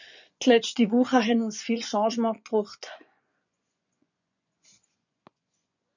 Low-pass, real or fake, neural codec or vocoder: 7.2 kHz; fake; vocoder, 24 kHz, 100 mel bands, Vocos